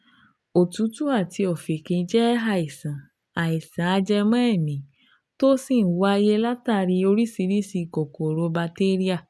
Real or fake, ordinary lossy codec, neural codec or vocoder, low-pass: real; none; none; none